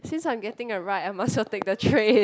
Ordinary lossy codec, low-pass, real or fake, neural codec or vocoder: none; none; real; none